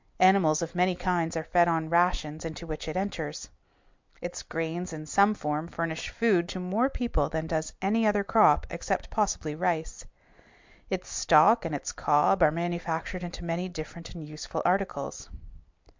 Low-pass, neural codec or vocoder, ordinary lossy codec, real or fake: 7.2 kHz; none; MP3, 64 kbps; real